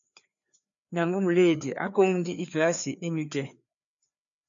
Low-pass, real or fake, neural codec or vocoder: 7.2 kHz; fake; codec, 16 kHz, 2 kbps, FreqCodec, larger model